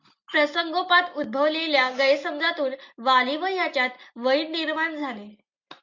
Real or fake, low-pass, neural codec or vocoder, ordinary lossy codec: real; 7.2 kHz; none; MP3, 64 kbps